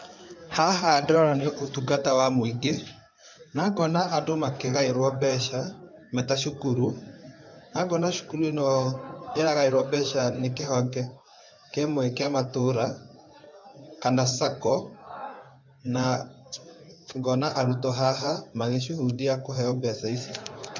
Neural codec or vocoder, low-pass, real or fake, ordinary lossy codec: codec, 16 kHz in and 24 kHz out, 2.2 kbps, FireRedTTS-2 codec; 7.2 kHz; fake; MP3, 64 kbps